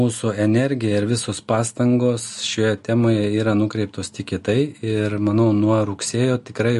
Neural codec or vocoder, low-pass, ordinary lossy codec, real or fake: none; 14.4 kHz; MP3, 48 kbps; real